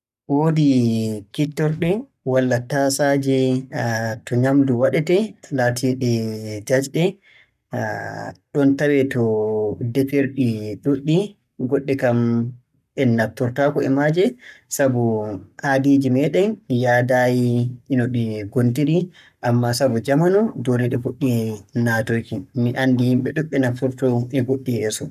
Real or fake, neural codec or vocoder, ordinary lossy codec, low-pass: fake; codec, 44.1 kHz, 7.8 kbps, Pupu-Codec; none; 14.4 kHz